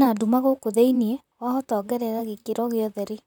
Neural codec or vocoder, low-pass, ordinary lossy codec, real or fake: vocoder, 44.1 kHz, 128 mel bands, Pupu-Vocoder; 19.8 kHz; none; fake